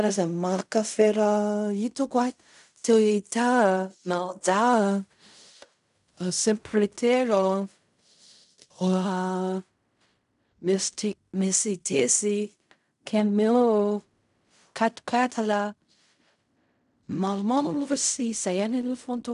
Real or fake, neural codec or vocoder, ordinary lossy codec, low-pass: fake; codec, 16 kHz in and 24 kHz out, 0.4 kbps, LongCat-Audio-Codec, fine tuned four codebook decoder; none; 10.8 kHz